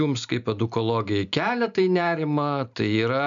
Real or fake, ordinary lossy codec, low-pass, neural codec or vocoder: real; MP3, 96 kbps; 7.2 kHz; none